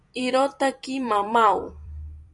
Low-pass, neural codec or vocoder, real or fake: 10.8 kHz; vocoder, 44.1 kHz, 128 mel bands every 256 samples, BigVGAN v2; fake